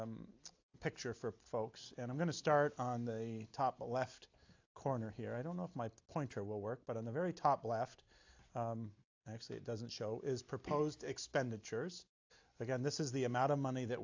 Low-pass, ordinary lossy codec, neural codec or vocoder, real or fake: 7.2 kHz; AAC, 48 kbps; none; real